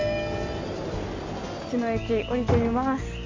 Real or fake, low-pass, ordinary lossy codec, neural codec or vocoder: real; 7.2 kHz; MP3, 48 kbps; none